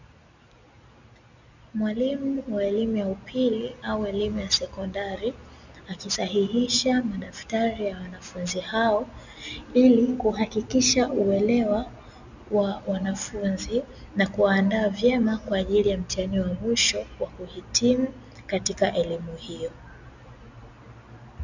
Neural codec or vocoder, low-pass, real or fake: none; 7.2 kHz; real